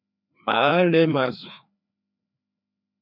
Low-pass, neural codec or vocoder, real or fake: 5.4 kHz; codec, 16 kHz, 2 kbps, FreqCodec, larger model; fake